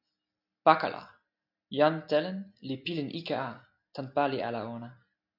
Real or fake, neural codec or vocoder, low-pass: real; none; 5.4 kHz